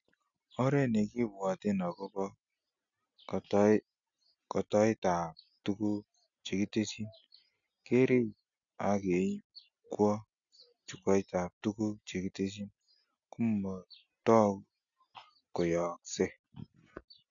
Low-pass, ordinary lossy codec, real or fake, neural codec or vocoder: 7.2 kHz; none; real; none